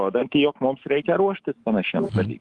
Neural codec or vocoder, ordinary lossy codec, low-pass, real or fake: autoencoder, 48 kHz, 128 numbers a frame, DAC-VAE, trained on Japanese speech; Opus, 24 kbps; 10.8 kHz; fake